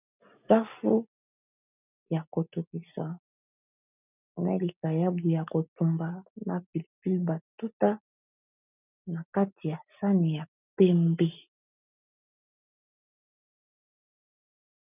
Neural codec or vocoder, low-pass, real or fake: none; 3.6 kHz; real